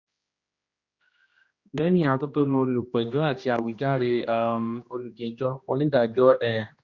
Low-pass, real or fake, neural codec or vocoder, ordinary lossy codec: 7.2 kHz; fake; codec, 16 kHz, 1 kbps, X-Codec, HuBERT features, trained on general audio; Opus, 64 kbps